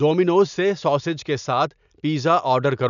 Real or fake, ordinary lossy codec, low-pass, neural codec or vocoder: real; none; 7.2 kHz; none